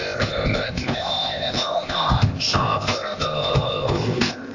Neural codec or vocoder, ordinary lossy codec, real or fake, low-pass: codec, 16 kHz, 0.8 kbps, ZipCodec; AAC, 48 kbps; fake; 7.2 kHz